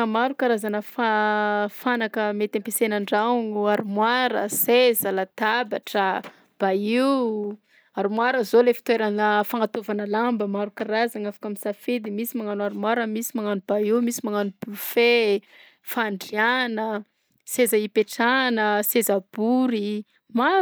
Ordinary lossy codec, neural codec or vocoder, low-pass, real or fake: none; none; none; real